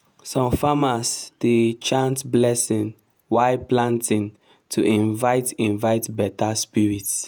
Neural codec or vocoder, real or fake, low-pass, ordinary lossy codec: vocoder, 48 kHz, 128 mel bands, Vocos; fake; none; none